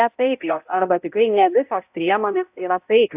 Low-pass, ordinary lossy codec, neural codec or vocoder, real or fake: 3.6 kHz; AAC, 32 kbps; codec, 16 kHz, 0.5 kbps, X-Codec, HuBERT features, trained on balanced general audio; fake